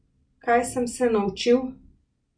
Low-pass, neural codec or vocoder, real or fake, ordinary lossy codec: 9.9 kHz; none; real; MP3, 48 kbps